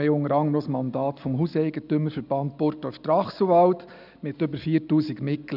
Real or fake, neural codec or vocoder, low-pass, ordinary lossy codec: real; none; 5.4 kHz; none